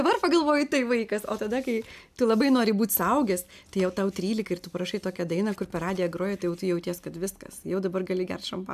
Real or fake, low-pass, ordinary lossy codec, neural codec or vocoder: real; 14.4 kHz; MP3, 96 kbps; none